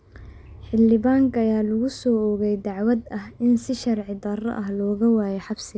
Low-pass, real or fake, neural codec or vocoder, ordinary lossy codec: none; real; none; none